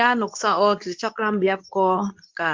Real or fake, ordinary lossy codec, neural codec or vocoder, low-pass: fake; Opus, 24 kbps; codec, 24 kHz, 0.9 kbps, WavTokenizer, medium speech release version 2; 7.2 kHz